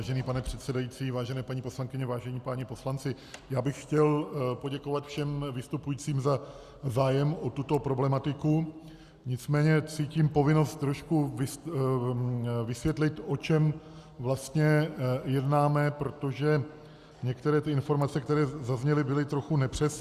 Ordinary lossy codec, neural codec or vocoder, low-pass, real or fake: Opus, 64 kbps; none; 14.4 kHz; real